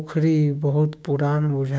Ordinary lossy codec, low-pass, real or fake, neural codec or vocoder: none; none; fake; codec, 16 kHz, 6 kbps, DAC